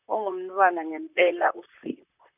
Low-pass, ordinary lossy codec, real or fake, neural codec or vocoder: 3.6 kHz; none; fake; vocoder, 44.1 kHz, 128 mel bands, Pupu-Vocoder